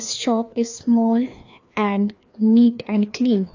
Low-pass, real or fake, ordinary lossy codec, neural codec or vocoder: 7.2 kHz; fake; AAC, 48 kbps; codec, 16 kHz, 2 kbps, FreqCodec, larger model